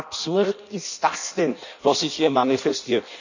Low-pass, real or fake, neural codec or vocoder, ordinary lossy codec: 7.2 kHz; fake; codec, 16 kHz in and 24 kHz out, 0.6 kbps, FireRedTTS-2 codec; none